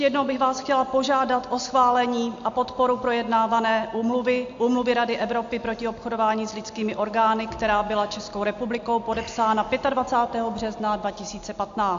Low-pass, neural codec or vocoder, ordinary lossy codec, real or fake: 7.2 kHz; none; AAC, 64 kbps; real